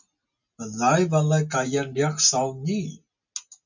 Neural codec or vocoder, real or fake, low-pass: none; real; 7.2 kHz